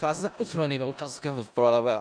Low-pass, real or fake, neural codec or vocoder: 9.9 kHz; fake; codec, 16 kHz in and 24 kHz out, 0.4 kbps, LongCat-Audio-Codec, four codebook decoder